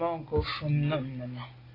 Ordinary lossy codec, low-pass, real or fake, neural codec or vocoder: AAC, 24 kbps; 5.4 kHz; real; none